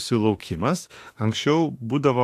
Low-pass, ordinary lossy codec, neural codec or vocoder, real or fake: 14.4 kHz; AAC, 64 kbps; autoencoder, 48 kHz, 32 numbers a frame, DAC-VAE, trained on Japanese speech; fake